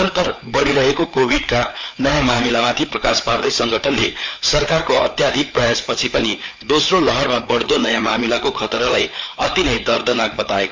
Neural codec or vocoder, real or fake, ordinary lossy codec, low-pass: codec, 16 kHz, 4 kbps, FreqCodec, larger model; fake; MP3, 64 kbps; 7.2 kHz